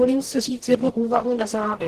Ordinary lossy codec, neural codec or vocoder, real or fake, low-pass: Opus, 16 kbps; codec, 44.1 kHz, 0.9 kbps, DAC; fake; 14.4 kHz